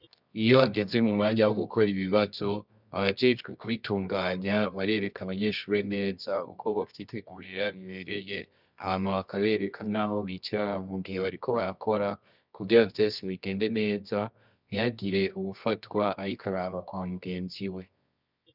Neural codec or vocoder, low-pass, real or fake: codec, 24 kHz, 0.9 kbps, WavTokenizer, medium music audio release; 5.4 kHz; fake